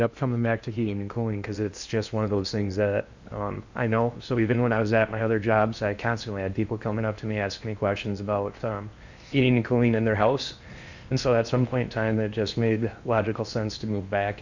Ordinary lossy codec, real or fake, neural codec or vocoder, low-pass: Opus, 64 kbps; fake; codec, 16 kHz in and 24 kHz out, 0.8 kbps, FocalCodec, streaming, 65536 codes; 7.2 kHz